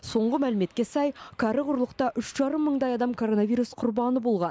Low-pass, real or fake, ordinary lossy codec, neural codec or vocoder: none; real; none; none